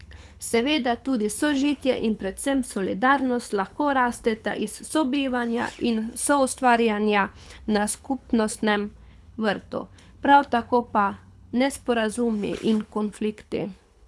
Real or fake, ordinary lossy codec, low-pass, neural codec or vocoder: fake; none; none; codec, 24 kHz, 6 kbps, HILCodec